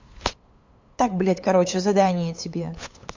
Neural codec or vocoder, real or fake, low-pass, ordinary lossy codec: codec, 16 kHz, 8 kbps, FunCodec, trained on LibriTTS, 25 frames a second; fake; 7.2 kHz; MP3, 48 kbps